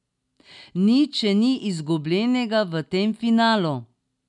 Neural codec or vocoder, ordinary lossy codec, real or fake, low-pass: none; none; real; 10.8 kHz